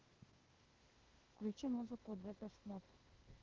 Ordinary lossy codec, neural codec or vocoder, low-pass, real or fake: Opus, 16 kbps; codec, 16 kHz, 0.8 kbps, ZipCodec; 7.2 kHz; fake